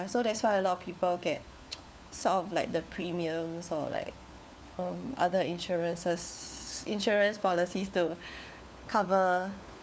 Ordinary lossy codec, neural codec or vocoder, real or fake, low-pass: none; codec, 16 kHz, 4 kbps, FunCodec, trained on LibriTTS, 50 frames a second; fake; none